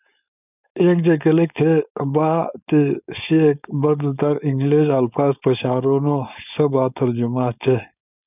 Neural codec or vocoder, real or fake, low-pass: codec, 16 kHz, 4.8 kbps, FACodec; fake; 3.6 kHz